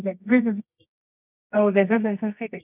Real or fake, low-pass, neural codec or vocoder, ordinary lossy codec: fake; 3.6 kHz; codec, 24 kHz, 0.9 kbps, WavTokenizer, medium music audio release; none